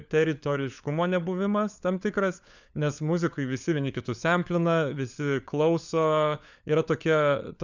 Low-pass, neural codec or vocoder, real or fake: 7.2 kHz; codec, 16 kHz, 4 kbps, FunCodec, trained on LibriTTS, 50 frames a second; fake